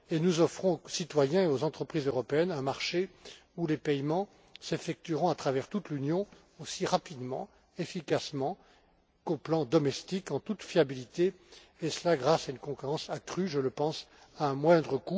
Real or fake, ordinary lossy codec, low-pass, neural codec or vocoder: real; none; none; none